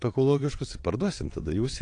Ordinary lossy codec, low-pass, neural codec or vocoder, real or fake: AAC, 48 kbps; 9.9 kHz; vocoder, 22.05 kHz, 80 mel bands, WaveNeXt; fake